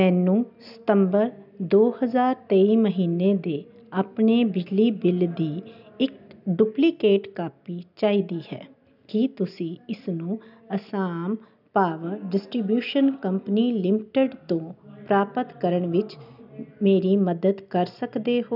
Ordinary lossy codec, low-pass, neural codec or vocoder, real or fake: none; 5.4 kHz; none; real